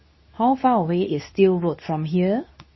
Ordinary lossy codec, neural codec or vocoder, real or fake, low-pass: MP3, 24 kbps; codec, 24 kHz, 0.9 kbps, WavTokenizer, medium speech release version 2; fake; 7.2 kHz